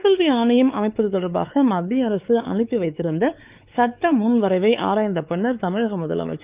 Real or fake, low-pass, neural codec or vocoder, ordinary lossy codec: fake; 3.6 kHz; codec, 16 kHz, 4 kbps, X-Codec, WavLM features, trained on Multilingual LibriSpeech; Opus, 24 kbps